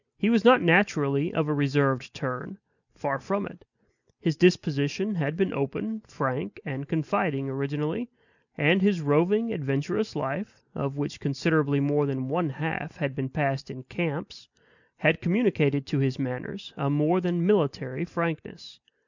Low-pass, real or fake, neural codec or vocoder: 7.2 kHz; real; none